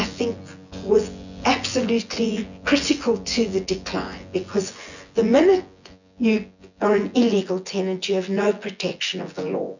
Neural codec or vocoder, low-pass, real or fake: vocoder, 24 kHz, 100 mel bands, Vocos; 7.2 kHz; fake